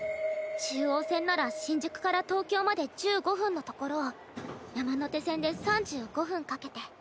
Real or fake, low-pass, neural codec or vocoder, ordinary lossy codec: real; none; none; none